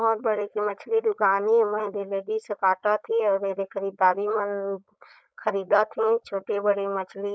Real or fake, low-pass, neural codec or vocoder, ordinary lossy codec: fake; none; codec, 16 kHz, 4.8 kbps, FACodec; none